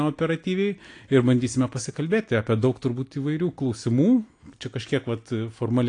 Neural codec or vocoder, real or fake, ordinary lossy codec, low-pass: none; real; AAC, 48 kbps; 9.9 kHz